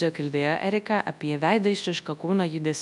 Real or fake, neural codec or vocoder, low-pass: fake; codec, 24 kHz, 0.9 kbps, WavTokenizer, large speech release; 10.8 kHz